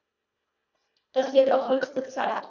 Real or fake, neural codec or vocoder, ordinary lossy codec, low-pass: fake; codec, 24 kHz, 1.5 kbps, HILCodec; none; 7.2 kHz